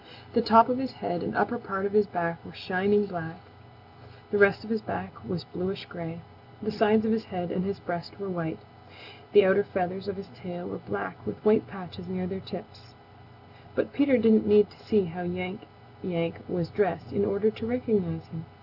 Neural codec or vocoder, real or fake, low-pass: none; real; 5.4 kHz